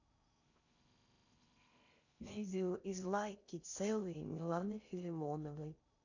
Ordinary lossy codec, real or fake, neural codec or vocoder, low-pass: none; fake; codec, 16 kHz in and 24 kHz out, 0.6 kbps, FocalCodec, streaming, 4096 codes; 7.2 kHz